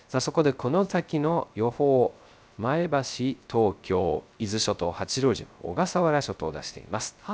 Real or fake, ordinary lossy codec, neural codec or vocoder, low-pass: fake; none; codec, 16 kHz, 0.3 kbps, FocalCodec; none